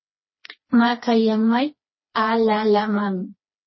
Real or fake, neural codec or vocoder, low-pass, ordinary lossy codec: fake; codec, 16 kHz, 2 kbps, FreqCodec, smaller model; 7.2 kHz; MP3, 24 kbps